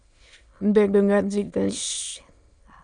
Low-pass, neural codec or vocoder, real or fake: 9.9 kHz; autoencoder, 22.05 kHz, a latent of 192 numbers a frame, VITS, trained on many speakers; fake